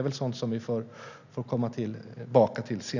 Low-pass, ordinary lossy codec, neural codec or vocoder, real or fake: 7.2 kHz; none; none; real